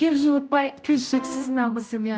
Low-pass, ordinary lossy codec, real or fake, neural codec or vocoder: none; none; fake; codec, 16 kHz, 0.5 kbps, X-Codec, HuBERT features, trained on general audio